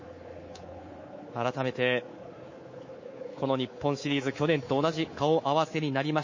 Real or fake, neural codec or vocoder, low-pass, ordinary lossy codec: fake; codec, 24 kHz, 3.1 kbps, DualCodec; 7.2 kHz; MP3, 32 kbps